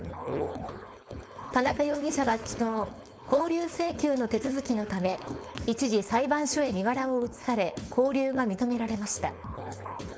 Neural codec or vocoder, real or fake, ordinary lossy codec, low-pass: codec, 16 kHz, 4.8 kbps, FACodec; fake; none; none